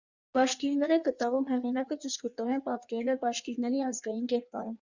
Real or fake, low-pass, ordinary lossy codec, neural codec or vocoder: fake; 7.2 kHz; Opus, 64 kbps; codec, 16 kHz in and 24 kHz out, 1.1 kbps, FireRedTTS-2 codec